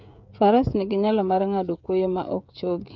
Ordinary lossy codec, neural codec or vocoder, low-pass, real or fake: MP3, 64 kbps; codec, 16 kHz, 16 kbps, FreqCodec, smaller model; 7.2 kHz; fake